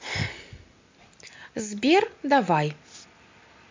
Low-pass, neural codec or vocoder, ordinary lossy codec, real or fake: 7.2 kHz; none; MP3, 64 kbps; real